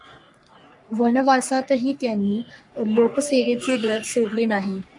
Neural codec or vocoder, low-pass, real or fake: codec, 44.1 kHz, 3.4 kbps, Pupu-Codec; 10.8 kHz; fake